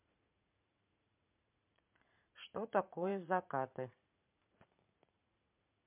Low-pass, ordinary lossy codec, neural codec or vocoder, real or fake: 3.6 kHz; MP3, 32 kbps; vocoder, 44.1 kHz, 80 mel bands, Vocos; fake